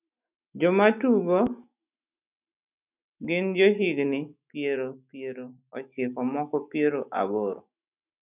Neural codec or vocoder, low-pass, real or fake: autoencoder, 48 kHz, 128 numbers a frame, DAC-VAE, trained on Japanese speech; 3.6 kHz; fake